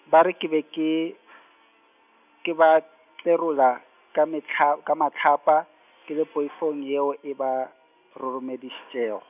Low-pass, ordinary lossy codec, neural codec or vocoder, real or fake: 3.6 kHz; none; none; real